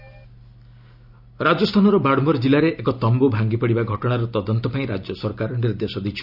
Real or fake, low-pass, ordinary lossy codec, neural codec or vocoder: real; 5.4 kHz; none; none